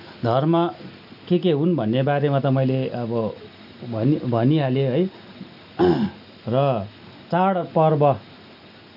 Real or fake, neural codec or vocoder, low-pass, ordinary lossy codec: real; none; 5.4 kHz; none